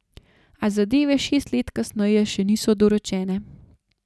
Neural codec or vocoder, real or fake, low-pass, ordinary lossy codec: none; real; none; none